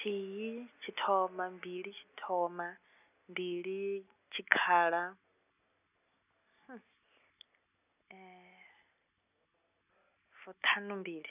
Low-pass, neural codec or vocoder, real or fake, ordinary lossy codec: 3.6 kHz; none; real; none